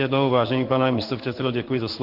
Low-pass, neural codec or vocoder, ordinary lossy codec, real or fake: 5.4 kHz; codec, 16 kHz in and 24 kHz out, 1 kbps, XY-Tokenizer; Opus, 16 kbps; fake